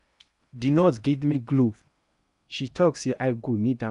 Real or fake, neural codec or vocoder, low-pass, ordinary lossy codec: fake; codec, 16 kHz in and 24 kHz out, 0.8 kbps, FocalCodec, streaming, 65536 codes; 10.8 kHz; none